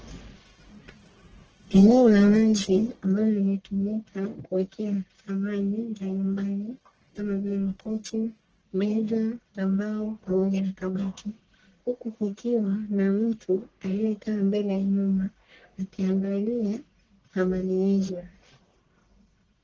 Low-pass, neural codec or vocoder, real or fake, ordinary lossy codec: 7.2 kHz; codec, 44.1 kHz, 1.7 kbps, Pupu-Codec; fake; Opus, 16 kbps